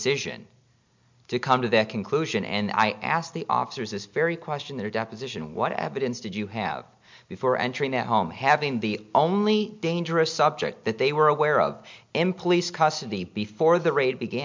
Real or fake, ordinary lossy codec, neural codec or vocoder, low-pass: real; MP3, 64 kbps; none; 7.2 kHz